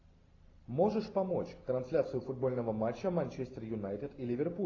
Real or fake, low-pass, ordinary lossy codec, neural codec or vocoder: real; 7.2 kHz; AAC, 32 kbps; none